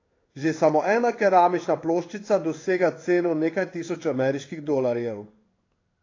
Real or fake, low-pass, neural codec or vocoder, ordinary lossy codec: fake; 7.2 kHz; codec, 16 kHz in and 24 kHz out, 1 kbps, XY-Tokenizer; AAC, 32 kbps